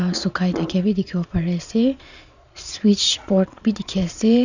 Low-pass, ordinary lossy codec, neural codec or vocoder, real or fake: 7.2 kHz; none; vocoder, 22.05 kHz, 80 mel bands, Vocos; fake